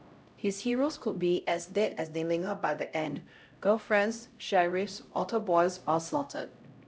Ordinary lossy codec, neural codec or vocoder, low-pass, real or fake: none; codec, 16 kHz, 0.5 kbps, X-Codec, HuBERT features, trained on LibriSpeech; none; fake